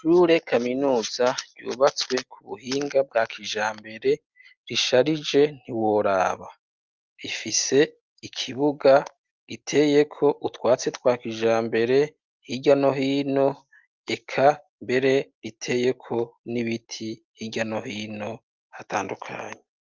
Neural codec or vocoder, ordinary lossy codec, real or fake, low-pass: none; Opus, 32 kbps; real; 7.2 kHz